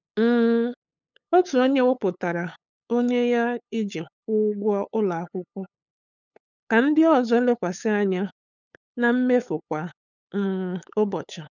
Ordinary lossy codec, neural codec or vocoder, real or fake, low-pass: none; codec, 16 kHz, 8 kbps, FunCodec, trained on LibriTTS, 25 frames a second; fake; 7.2 kHz